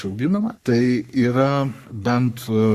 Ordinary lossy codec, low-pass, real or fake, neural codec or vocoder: Opus, 64 kbps; 14.4 kHz; fake; codec, 44.1 kHz, 3.4 kbps, Pupu-Codec